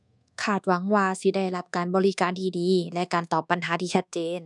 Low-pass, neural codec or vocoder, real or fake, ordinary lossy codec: 10.8 kHz; codec, 24 kHz, 1.2 kbps, DualCodec; fake; none